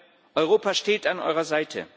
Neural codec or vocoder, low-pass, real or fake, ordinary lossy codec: none; none; real; none